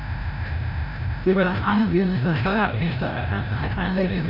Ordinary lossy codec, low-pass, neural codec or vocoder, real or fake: none; 5.4 kHz; codec, 16 kHz, 0.5 kbps, FreqCodec, larger model; fake